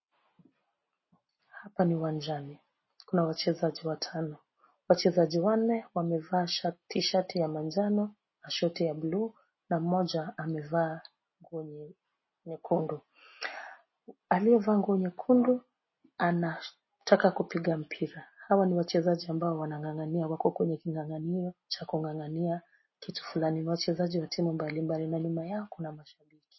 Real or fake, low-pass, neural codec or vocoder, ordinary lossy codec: real; 7.2 kHz; none; MP3, 24 kbps